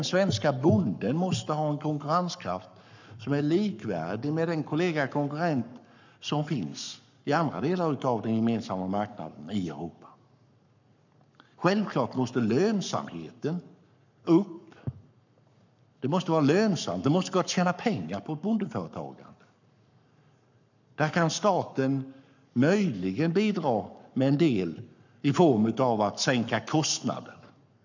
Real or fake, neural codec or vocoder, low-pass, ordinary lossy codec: fake; codec, 44.1 kHz, 7.8 kbps, Pupu-Codec; 7.2 kHz; none